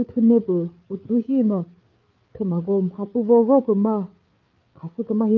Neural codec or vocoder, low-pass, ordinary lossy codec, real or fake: codec, 16 kHz, 8 kbps, FreqCodec, larger model; 7.2 kHz; Opus, 32 kbps; fake